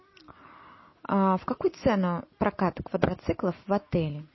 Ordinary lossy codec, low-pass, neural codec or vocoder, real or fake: MP3, 24 kbps; 7.2 kHz; none; real